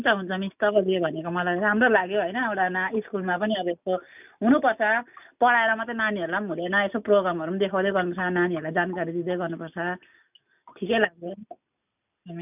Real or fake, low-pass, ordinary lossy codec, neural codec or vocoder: real; 3.6 kHz; none; none